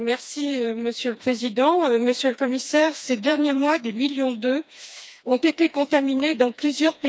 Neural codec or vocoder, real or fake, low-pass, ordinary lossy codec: codec, 16 kHz, 2 kbps, FreqCodec, smaller model; fake; none; none